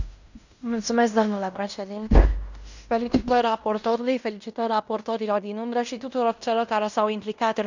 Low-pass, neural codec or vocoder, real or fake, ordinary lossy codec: 7.2 kHz; codec, 16 kHz in and 24 kHz out, 0.9 kbps, LongCat-Audio-Codec, fine tuned four codebook decoder; fake; none